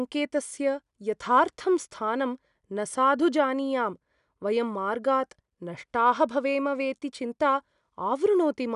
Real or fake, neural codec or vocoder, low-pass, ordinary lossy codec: real; none; 10.8 kHz; none